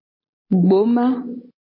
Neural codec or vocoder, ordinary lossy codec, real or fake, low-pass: none; MP3, 24 kbps; real; 5.4 kHz